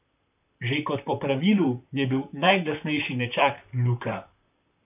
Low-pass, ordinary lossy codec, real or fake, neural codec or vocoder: 3.6 kHz; none; fake; vocoder, 44.1 kHz, 128 mel bands, Pupu-Vocoder